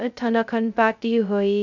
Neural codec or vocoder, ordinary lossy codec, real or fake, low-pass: codec, 16 kHz, 0.2 kbps, FocalCodec; none; fake; 7.2 kHz